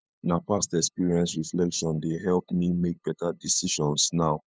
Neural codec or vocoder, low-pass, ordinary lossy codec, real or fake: codec, 16 kHz, 8 kbps, FunCodec, trained on LibriTTS, 25 frames a second; none; none; fake